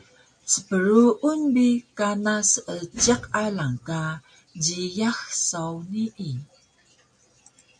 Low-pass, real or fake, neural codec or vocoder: 9.9 kHz; real; none